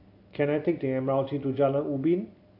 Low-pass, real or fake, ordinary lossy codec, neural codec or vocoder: 5.4 kHz; real; AAC, 32 kbps; none